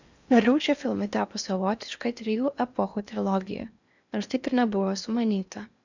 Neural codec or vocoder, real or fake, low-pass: codec, 16 kHz in and 24 kHz out, 0.8 kbps, FocalCodec, streaming, 65536 codes; fake; 7.2 kHz